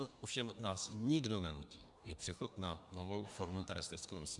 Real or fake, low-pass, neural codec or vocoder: fake; 10.8 kHz; codec, 24 kHz, 1 kbps, SNAC